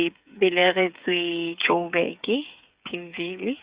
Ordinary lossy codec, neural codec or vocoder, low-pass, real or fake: Opus, 64 kbps; codec, 16 kHz, 8 kbps, FreqCodec, smaller model; 3.6 kHz; fake